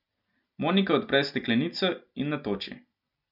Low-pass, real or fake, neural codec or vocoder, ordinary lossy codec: 5.4 kHz; real; none; none